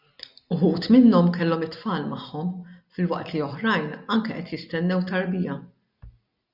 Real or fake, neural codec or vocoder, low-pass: real; none; 5.4 kHz